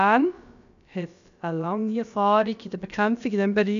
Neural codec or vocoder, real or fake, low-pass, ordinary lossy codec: codec, 16 kHz, about 1 kbps, DyCAST, with the encoder's durations; fake; 7.2 kHz; none